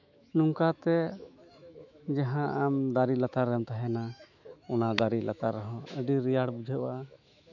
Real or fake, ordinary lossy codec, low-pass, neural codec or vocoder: fake; none; 7.2 kHz; autoencoder, 48 kHz, 128 numbers a frame, DAC-VAE, trained on Japanese speech